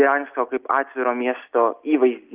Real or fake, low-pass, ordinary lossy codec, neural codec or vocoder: real; 3.6 kHz; Opus, 32 kbps; none